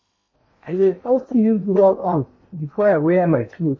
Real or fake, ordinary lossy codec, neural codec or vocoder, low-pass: fake; MP3, 32 kbps; codec, 16 kHz in and 24 kHz out, 0.8 kbps, FocalCodec, streaming, 65536 codes; 7.2 kHz